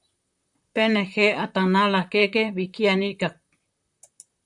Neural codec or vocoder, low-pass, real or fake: vocoder, 44.1 kHz, 128 mel bands, Pupu-Vocoder; 10.8 kHz; fake